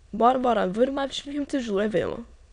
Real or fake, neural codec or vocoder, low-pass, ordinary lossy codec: fake; autoencoder, 22.05 kHz, a latent of 192 numbers a frame, VITS, trained on many speakers; 9.9 kHz; none